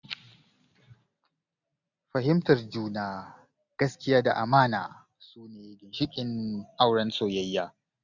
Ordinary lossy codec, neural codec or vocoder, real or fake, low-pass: none; none; real; 7.2 kHz